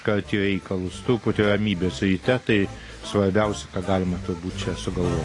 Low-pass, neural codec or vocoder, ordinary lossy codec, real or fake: 10.8 kHz; none; AAC, 32 kbps; real